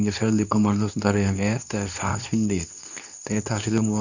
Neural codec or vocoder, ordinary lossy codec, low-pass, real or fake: codec, 24 kHz, 0.9 kbps, WavTokenizer, medium speech release version 2; none; 7.2 kHz; fake